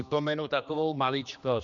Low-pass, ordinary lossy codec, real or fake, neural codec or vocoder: 7.2 kHz; Opus, 64 kbps; fake; codec, 16 kHz, 2 kbps, X-Codec, HuBERT features, trained on general audio